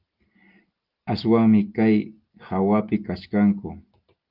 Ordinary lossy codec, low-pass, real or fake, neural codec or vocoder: Opus, 32 kbps; 5.4 kHz; real; none